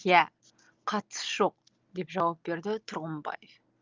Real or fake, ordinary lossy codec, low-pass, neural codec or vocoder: real; Opus, 32 kbps; 7.2 kHz; none